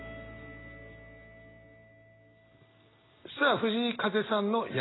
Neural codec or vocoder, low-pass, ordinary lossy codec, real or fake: none; 7.2 kHz; AAC, 16 kbps; real